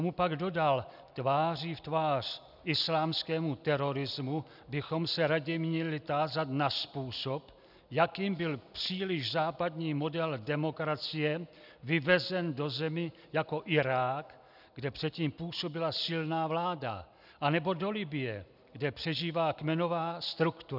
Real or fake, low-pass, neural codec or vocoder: real; 5.4 kHz; none